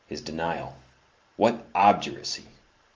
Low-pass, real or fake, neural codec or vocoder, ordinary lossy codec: 7.2 kHz; fake; vocoder, 44.1 kHz, 128 mel bands every 512 samples, BigVGAN v2; Opus, 32 kbps